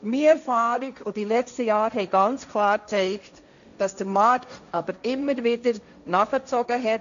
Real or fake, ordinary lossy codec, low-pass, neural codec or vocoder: fake; none; 7.2 kHz; codec, 16 kHz, 1.1 kbps, Voila-Tokenizer